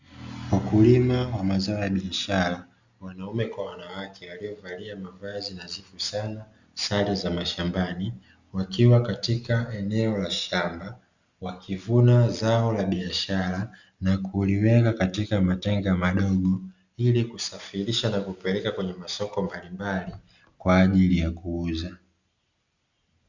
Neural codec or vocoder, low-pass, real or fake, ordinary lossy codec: none; 7.2 kHz; real; Opus, 64 kbps